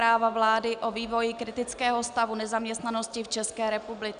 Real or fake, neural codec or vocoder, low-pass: real; none; 9.9 kHz